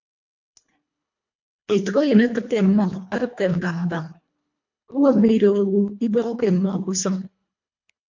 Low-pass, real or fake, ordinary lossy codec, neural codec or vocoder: 7.2 kHz; fake; MP3, 48 kbps; codec, 24 kHz, 1.5 kbps, HILCodec